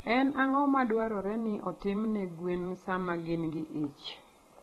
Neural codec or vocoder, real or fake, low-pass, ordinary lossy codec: vocoder, 44.1 kHz, 128 mel bands every 512 samples, BigVGAN v2; fake; 19.8 kHz; AAC, 32 kbps